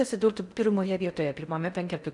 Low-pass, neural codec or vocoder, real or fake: 10.8 kHz; codec, 16 kHz in and 24 kHz out, 0.6 kbps, FocalCodec, streaming, 4096 codes; fake